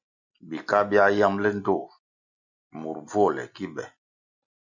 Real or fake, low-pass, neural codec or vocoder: real; 7.2 kHz; none